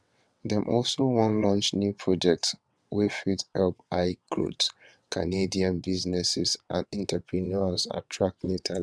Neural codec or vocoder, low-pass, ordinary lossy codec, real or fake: vocoder, 22.05 kHz, 80 mel bands, WaveNeXt; none; none; fake